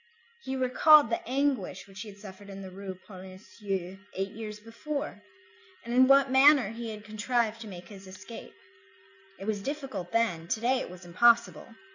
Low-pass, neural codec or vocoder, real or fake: 7.2 kHz; none; real